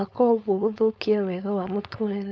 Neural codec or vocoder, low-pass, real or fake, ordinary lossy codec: codec, 16 kHz, 4.8 kbps, FACodec; none; fake; none